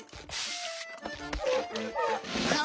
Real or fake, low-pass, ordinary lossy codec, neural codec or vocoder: real; none; none; none